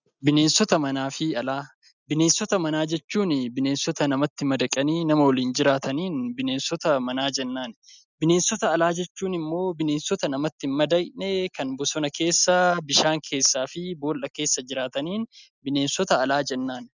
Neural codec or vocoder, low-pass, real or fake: none; 7.2 kHz; real